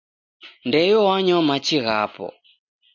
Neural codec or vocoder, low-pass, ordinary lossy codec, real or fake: none; 7.2 kHz; MP3, 48 kbps; real